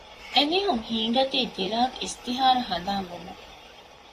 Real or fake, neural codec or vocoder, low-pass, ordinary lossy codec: fake; vocoder, 44.1 kHz, 128 mel bands, Pupu-Vocoder; 14.4 kHz; AAC, 48 kbps